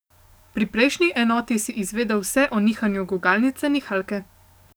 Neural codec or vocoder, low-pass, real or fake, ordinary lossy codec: codec, 44.1 kHz, 7.8 kbps, DAC; none; fake; none